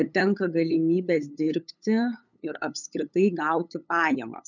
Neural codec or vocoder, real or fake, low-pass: codec, 16 kHz, 8 kbps, FunCodec, trained on LibriTTS, 25 frames a second; fake; 7.2 kHz